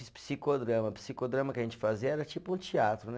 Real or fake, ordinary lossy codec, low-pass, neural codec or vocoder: real; none; none; none